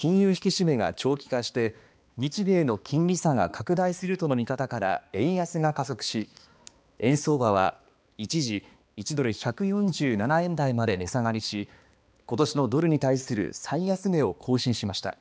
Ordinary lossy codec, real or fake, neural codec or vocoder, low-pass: none; fake; codec, 16 kHz, 2 kbps, X-Codec, HuBERT features, trained on balanced general audio; none